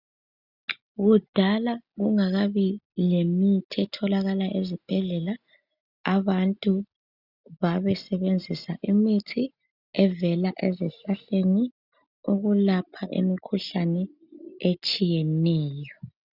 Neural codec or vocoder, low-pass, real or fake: none; 5.4 kHz; real